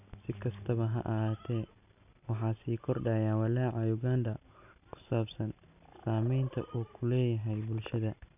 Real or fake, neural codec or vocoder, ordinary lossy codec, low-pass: real; none; none; 3.6 kHz